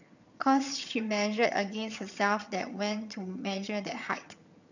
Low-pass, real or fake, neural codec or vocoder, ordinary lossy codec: 7.2 kHz; fake; vocoder, 22.05 kHz, 80 mel bands, HiFi-GAN; none